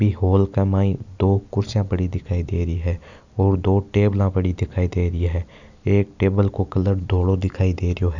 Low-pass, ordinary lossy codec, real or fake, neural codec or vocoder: 7.2 kHz; none; real; none